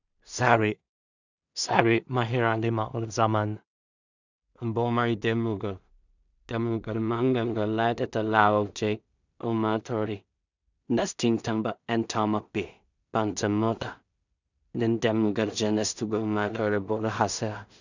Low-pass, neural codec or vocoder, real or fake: 7.2 kHz; codec, 16 kHz in and 24 kHz out, 0.4 kbps, LongCat-Audio-Codec, two codebook decoder; fake